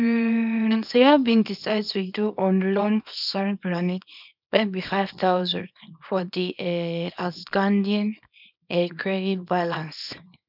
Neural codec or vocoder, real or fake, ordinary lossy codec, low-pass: codec, 24 kHz, 0.9 kbps, WavTokenizer, small release; fake; none; 5.4 kHz